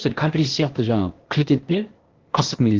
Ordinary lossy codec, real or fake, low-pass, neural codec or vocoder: Opus, 16 kbps; fake; 7.2 kHz; codec, 16 kHz, 0.8 kbps, ZipCodec